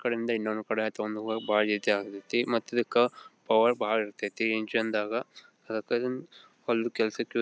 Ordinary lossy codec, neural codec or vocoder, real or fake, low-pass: none; none; real; none